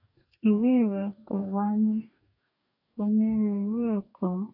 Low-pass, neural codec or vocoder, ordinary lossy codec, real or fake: 5.4 kHz; codec, 44.1 kHz, 2.6 kbps, DAC; AAC, 48 kbps; fake